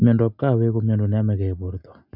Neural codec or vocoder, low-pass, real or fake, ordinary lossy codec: none; 5.4 kHz; real; none